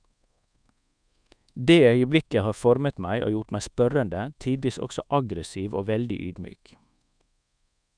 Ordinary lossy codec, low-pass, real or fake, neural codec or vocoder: none; 9.9 kHz; fake; codec, 24 kHz, 1.2 kbps, DualCodec